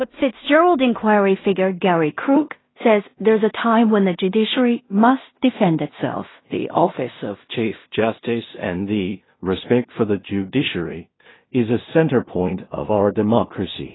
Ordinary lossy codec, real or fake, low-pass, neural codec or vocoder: AAC, 16 kbps; fake; 7.2 kHz; codec, 16 kHz in and 24 kHz out, 0.4 kbps, LongCat-Audio-Codec, two codebook decoder